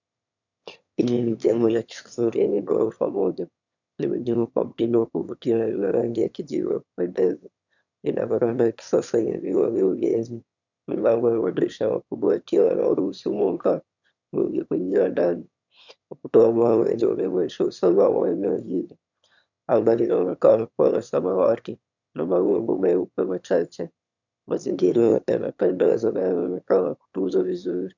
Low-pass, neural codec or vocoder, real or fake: 7.2 kHz; autoencoder, 22.05 kHz, a latent of 192 numbers a frame, VITS, trained on one speaker; fake